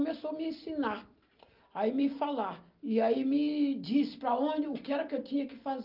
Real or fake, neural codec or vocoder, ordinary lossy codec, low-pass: real; none; Opus, 24 kbps; 5.4 kHz